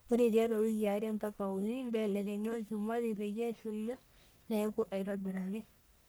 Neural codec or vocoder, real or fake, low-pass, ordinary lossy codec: codec, 44.1 kHz, 1.7 kbps, Pupu-Codec; fake; none; none